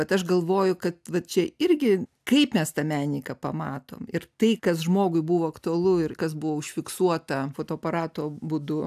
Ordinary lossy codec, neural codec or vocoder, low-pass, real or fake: AAC, 96 kbps; none; 14.4 kHz; real